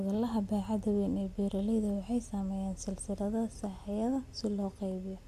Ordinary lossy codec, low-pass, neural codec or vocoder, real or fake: MP3, 64 kbps; 14.4 kHz; none; real